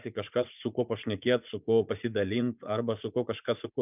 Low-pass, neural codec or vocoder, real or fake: 3.6 kHz; vocoder, 44.1 kHz, 80 mel bands, Vocos; fake